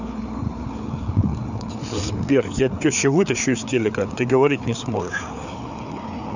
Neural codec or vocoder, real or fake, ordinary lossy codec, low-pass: codec, 16 kHz, 4 kbps, FreqCodec, larger model; fake; none; 7.2 kHz